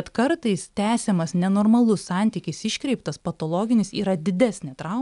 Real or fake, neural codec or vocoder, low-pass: real; none; 10.8 kHz